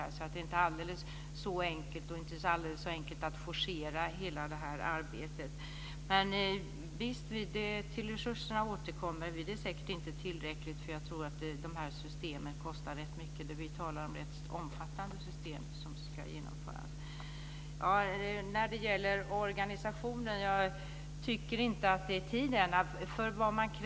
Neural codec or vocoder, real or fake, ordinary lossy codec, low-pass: none; real; none; none